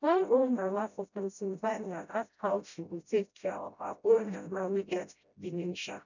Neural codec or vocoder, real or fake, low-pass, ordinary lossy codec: codec, 16 kHz, 0.5 kbps, FreqCodec, smaller model; fake; 7.2 kHz; none